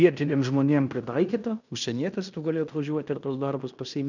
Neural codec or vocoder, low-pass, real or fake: codec, 16 kHz in and 24 kHz out, 0.9 kbps, LongCat-Audio-Codec, fine tuned four codebook decoder; 7.2 kHz; fake